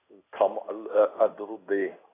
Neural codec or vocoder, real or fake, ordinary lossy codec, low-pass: codec, 16 kHz in and 24 kHz out, 1 kbps, XY-Tokenizer; fake; AAC, 16 kbps; 3.6 kHz